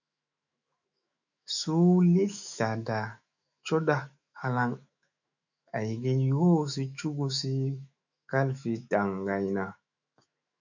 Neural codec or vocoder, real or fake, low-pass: autoencoder, 48 kHz, 128 numbers a frame, DAC-VAE, trained on Japanese speech; fake; 7.2 kHz